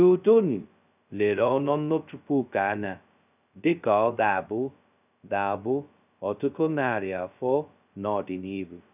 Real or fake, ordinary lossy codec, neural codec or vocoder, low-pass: fake; none; codec, 16 kHz, 0.2 kbps, FocalCodec; 3.6 kHz